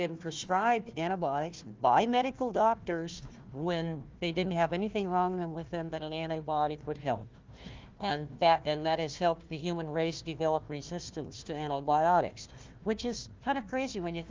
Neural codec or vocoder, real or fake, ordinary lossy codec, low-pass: codec, 16 kHz, 1 kbps, FunCodec, trained on Chinese and English, 50 frames a second; fake; Opus, 32 kbps; 7.2 kHz